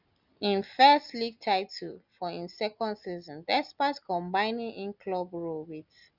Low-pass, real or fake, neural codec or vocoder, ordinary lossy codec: 5.4 kHz; real; none; Opus, 64 kbps